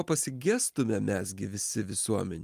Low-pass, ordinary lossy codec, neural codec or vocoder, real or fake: 14.4 kHz; Opus, 32 kbps; none; real